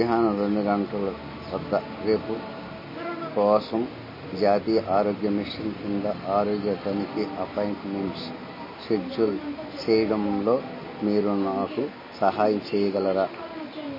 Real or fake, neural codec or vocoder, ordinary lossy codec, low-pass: real; none; MP3, 24 kbps; 5.4 kHz